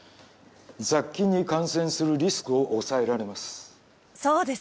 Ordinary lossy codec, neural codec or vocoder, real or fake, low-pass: none; none; real; none